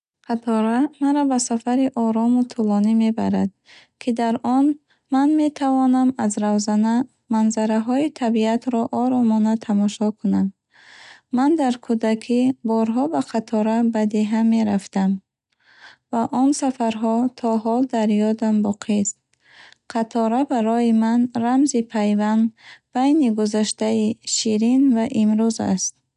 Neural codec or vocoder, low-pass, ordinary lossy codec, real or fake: none; 10.8 kHz; none; real